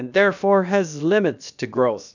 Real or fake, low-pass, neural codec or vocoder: fake; 7.2 kHz; codec, 16 kHz, about 1 kbps, DyCAST, with the encoder's durations